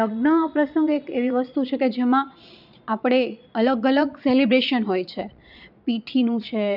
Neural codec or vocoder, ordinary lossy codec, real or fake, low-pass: vocoder, 22.05 kHz, 80 mel bands, Vocos; none; fake; 5.4 kHz